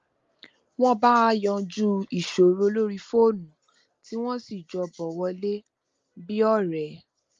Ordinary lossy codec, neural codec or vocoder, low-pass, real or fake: Opus, 32 kbps; none; 7.2 kHz; real